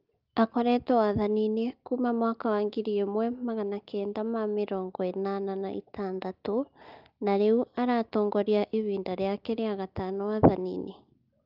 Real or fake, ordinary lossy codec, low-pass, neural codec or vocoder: real; Opus, 24 kbps; 5.4 kHz; none